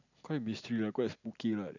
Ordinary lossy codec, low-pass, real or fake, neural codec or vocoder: none; 7.2 kHz; real; none